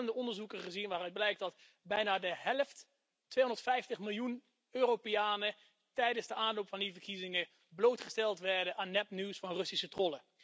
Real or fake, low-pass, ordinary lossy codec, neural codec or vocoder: real; none; none; none